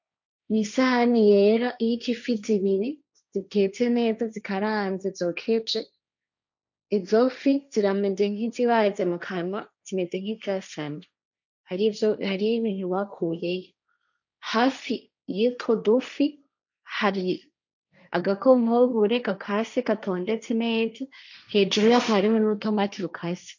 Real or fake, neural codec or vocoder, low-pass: fake; codec, 16 kHz, 1.1 kbps, Voila-Tokenizer; 7.2 kHz